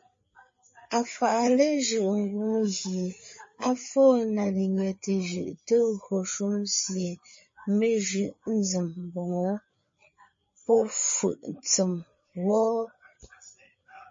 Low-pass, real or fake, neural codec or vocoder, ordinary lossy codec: 7.2 kHz; fake; codec, 16 kHz, 4 kbps, FreqCodec, larger model; MP3, 32 kbps